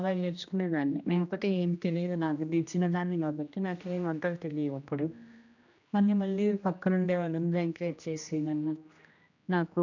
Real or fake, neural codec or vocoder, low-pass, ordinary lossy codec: fake; codec, 16 kHz, 1 kbps, X-Codec, HuBERT features, trained on general audio; 7.2 kHz; none